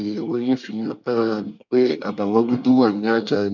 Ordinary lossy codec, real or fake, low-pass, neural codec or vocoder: none; fake; 7.2 kHz; codec, 24 kHz, 1 kbps, SNAC